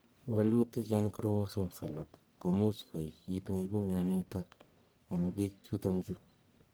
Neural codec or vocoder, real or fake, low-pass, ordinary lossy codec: codec, 44.1 kHz, 1.7 kbps, Pupu-Codec; fake; none; none